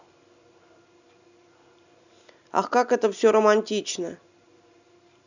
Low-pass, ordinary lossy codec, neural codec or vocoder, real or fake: 7.2 kHz; none; none; real